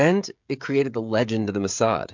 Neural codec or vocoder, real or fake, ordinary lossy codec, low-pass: codec, 16 kHz, 16 kbps, FreqCodec, smaller model; fake; MP3, 64 kbps; 7.2 kHz